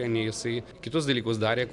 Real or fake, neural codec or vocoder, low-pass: real; none; 10.8 kHz